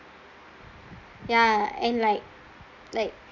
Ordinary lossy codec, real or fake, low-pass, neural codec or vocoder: none; real; 7.2 kHz; none